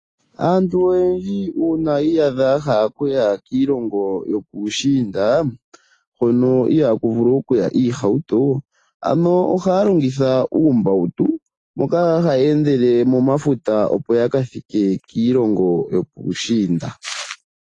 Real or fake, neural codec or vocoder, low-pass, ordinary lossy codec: real; none; 10.8 kHz; AAC, 32 kbps